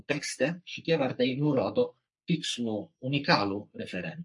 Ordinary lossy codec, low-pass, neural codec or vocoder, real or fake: MP3, 48 kbps; 10.8 kHz; codec, 44.1 kHz, 3.4 kbps, Pupu-Codec; fake